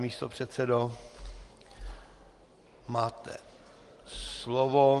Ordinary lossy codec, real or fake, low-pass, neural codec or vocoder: Opus, 24 kbps; real; 10.8 kHz; none